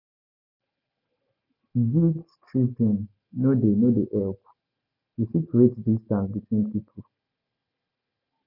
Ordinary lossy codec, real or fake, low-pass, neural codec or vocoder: none; real; 5.4 kHz; none